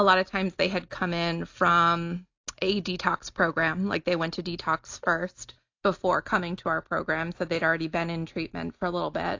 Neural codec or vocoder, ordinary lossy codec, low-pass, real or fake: none; AAC, 48 kbps; 7.2 kHz; real